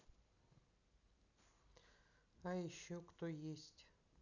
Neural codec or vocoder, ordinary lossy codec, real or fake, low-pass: none; none; real; 7.2 kHz